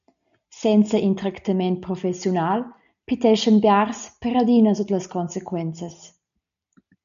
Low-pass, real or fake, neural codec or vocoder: 7.2 kHz; real; none